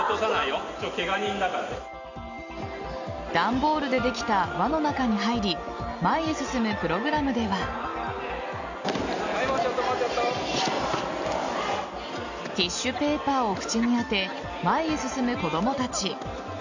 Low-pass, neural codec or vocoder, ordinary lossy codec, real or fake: 7.2 kHz; none; Opus, 64 kbps; real